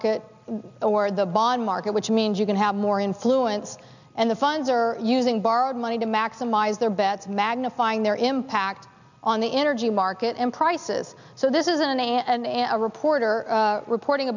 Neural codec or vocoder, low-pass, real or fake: none; 7.2 kHz; real